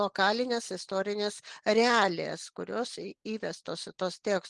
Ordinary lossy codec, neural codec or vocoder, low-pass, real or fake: Opus, 24 kbps; none; 10.8 kHz; real